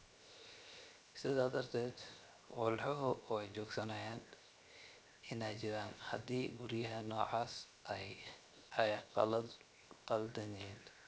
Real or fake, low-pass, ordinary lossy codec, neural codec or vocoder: fake; none; none; codec, 16 kHz, 0.7 kbps, FocalCodec